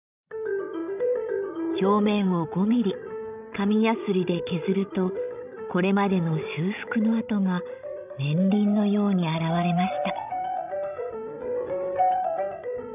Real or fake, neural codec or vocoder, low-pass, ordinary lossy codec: fake; codec, 16 kHz, 16 kbps, FreqCodec, larger model; 3.6 kHz; none